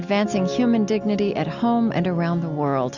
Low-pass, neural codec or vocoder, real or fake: 7.2 kHz; none; real